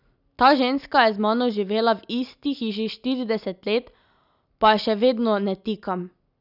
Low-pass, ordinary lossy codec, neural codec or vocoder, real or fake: 5.4 kHz; none; none; real